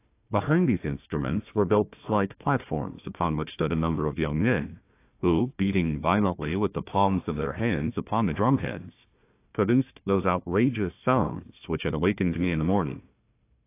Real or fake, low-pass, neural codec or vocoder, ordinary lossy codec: fake; 3.6 kHz; codec, 16 kHz, 1 kbps, FunCodec, trained on Chinese and English, 50 frames a second; AAC, 24 kbps